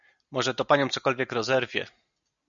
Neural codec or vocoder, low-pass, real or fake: none; 7.2 kHz; real